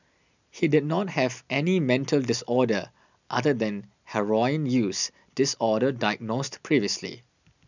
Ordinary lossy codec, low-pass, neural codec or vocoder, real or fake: none; 7.2 kHz; none; real